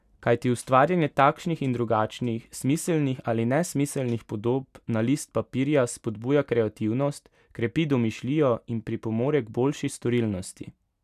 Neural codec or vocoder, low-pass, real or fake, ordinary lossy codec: none; 14.4 kHz; real; none